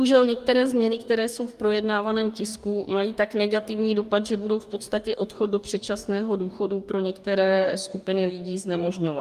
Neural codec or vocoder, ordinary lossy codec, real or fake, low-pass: codec, 44.1 kHz, 2.6 kbps, DAC; Opus, 32 kbps; fake; 14.4 kHz